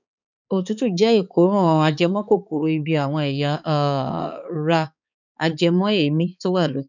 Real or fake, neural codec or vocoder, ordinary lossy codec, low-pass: fake; codec, 16 kHz, 4 kbps, X-Codec, HuBERT features, trained on balanced general audio; none; 7.2 kHz